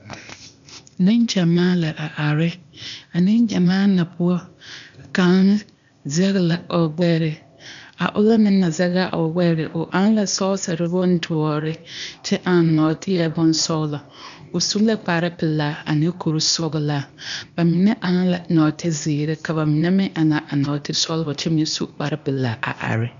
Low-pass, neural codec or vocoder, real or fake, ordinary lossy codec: 7.2 kHz; codec, 16 kHz, 0.8 kbps, ZipCodec; fake; AAC, 96 kbps